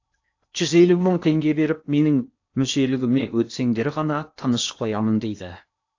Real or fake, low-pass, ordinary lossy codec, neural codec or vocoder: fake; 7.2 kHz; AAC, 48 kbps; codec, 16 kHz in and 24 kHz out, 0.8 kbps, FocalCodec, streaming, 65536 codes